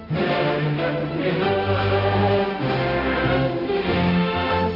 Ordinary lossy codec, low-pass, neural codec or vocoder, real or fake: AAC, 24 kbps; 5.4 kHz; codec, 16 kHz, 0.5 kbps, X-Codec, HuBERT features, trained on balanced general audio; fake